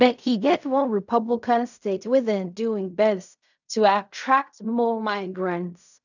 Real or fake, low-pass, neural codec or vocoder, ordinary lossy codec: fake; 7.2 kHz; codec, 16 kHz in and 24 kHz out, 0.4 kbps, LongCat-Audio-Codec, fine tuned four codebook decoder; none